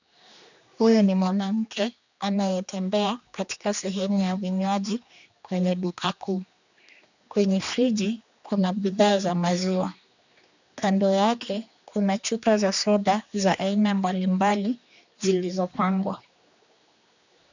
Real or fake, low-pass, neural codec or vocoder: fake; 7.2 kHz; codec, 16 kHz, 2 kbps, X-Codec, HuBERT features, trained on general audio